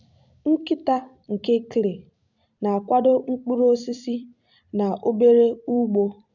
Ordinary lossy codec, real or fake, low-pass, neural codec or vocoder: none; fake; 7.2 kHz; vocoder, 44.1 kHz, 128 mel bands every 256 samples, BigVGAN v2